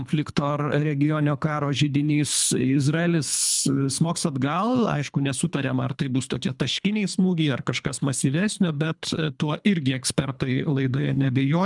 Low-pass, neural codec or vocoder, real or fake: 10.8 kHz; codec, 24 kHz, 3 kbps, HILCodec; fake